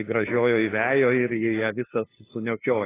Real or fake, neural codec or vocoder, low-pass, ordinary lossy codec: fake; codec, 16 kHz, 2 kbps, FunCodec, trained on LibriTTS, 25 frames a second; 3.6 kHz; AAC, 16 kbps